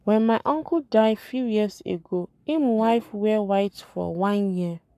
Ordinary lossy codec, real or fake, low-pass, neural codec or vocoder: none; fake; 14.4 kHz; codec, 44.1 kHz, 7.8 kbps, Pupu-Codec